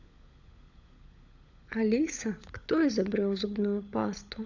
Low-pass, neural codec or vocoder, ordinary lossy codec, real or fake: 7.2 kHz; codec, 16 kHz, 16 kbps, FunCodec, trained on LibriTTS, 50 frames a second; none; fake